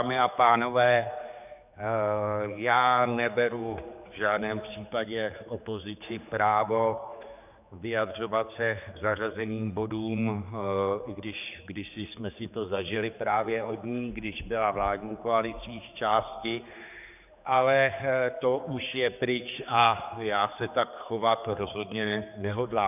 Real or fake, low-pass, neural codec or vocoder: fake; 3.6 kHz; codec, 16 kHz, 4 kbps, X-Codec, HuBERT features, trained on general audio